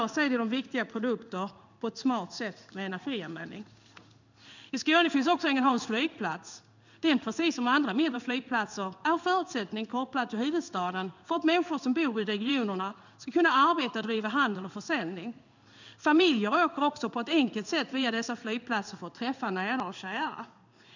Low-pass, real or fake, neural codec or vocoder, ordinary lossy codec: 7.2 kHz; fake; codec, 16 kHz in and 24 kHz out, 1 kbps, XY-Tokenizer; none